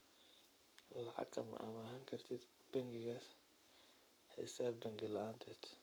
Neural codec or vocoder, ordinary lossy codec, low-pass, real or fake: codec, 44.1 kHz, 7.8 kbps, Pupu-Codec; none; none; fake